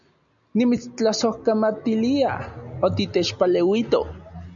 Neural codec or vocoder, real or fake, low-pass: none; real; 7.2 kHz